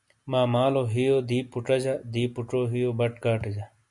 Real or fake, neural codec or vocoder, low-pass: real; none; 10.8 kHz